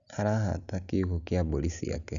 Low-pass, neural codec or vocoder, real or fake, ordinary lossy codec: 7.2 kHz; none; real; none